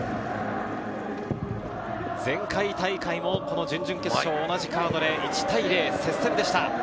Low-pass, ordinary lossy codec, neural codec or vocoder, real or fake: none; none; none; real